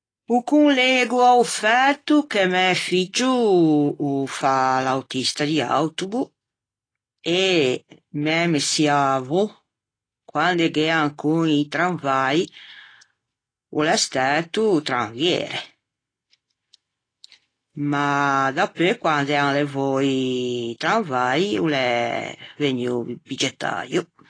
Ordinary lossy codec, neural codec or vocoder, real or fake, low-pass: AAC, 32 kbps; none; real; 9.9 kHz